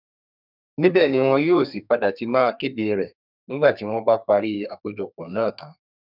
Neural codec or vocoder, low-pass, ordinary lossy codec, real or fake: codec, 32 kHz, 1.9 kbps, SNAC; 5.4 kHz; none; fake